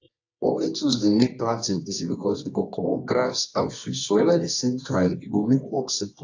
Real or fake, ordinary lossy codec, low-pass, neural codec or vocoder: fake; AAC, 48 kbps; 7.2 kHz; codec, 24 kHz, 0.9 kbps, WavTokenizer, medium music audio release